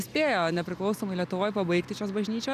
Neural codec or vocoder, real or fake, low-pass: none; real; 14.4 kHz